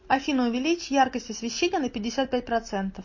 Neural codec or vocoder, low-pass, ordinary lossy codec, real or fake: none; 7.2 kHz; MP3, 32 kbps; real